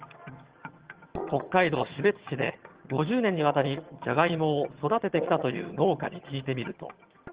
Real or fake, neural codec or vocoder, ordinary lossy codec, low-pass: fake; vocoder, 22.05 kHz, 80 mel bands, HiFi-GAN; Opus, 16 kbps; 3.6 kHz